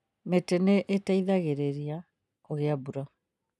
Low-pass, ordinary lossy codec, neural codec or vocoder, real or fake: none; none; none; real